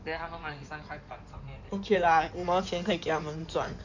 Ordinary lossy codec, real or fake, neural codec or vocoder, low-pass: none; fake; codec, 16 kHz in and 24 kHz out, 2.2 kbps, FireRedTTS-2 codec; 7.2 kHz